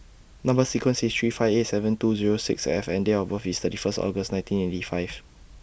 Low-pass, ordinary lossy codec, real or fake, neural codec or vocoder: none; none; real; none